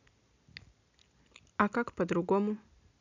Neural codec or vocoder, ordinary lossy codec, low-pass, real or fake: none; none; 7.2 kHz; real